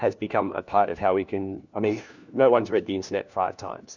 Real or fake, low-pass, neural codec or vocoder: fake; 7.2 kHz; codec, 16 kHz, 1 kbps, FunCodec, trained on LibriTTS, 50 frames a second